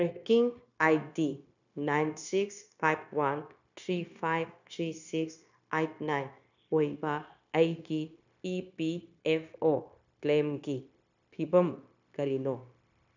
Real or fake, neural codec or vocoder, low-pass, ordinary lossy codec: fake; codec, 16 kHz, 0.9 kbps, LongCat-Audio-Codec; 7.2 kHz; none